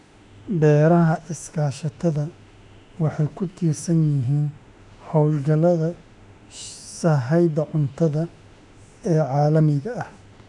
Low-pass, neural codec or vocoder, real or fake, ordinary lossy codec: 10.8 kHz; autoencoder, 48 kHz, 32 numbers a frame, DAC-VAE, trained on Japanese speech; fake; none